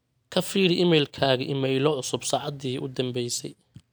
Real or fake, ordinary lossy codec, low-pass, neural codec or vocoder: real; none; none; none